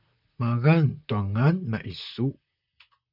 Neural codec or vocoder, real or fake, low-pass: vocoder, 44.1 kHz, 128 mel bands, Pupu-Vocoder; fake; 5.4 kHz